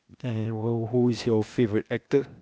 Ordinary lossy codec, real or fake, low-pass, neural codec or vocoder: none; fake; none; codec, 16 kHz, 0.8 kbps, ZipCodec